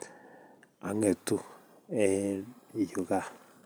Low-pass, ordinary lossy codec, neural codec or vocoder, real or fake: none; none; vocoder, 44.1 kHz, 128 mel bands every 512 samples, BigVGAN v2; fake